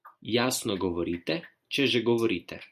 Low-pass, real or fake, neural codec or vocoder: 14.4 kHz; real; none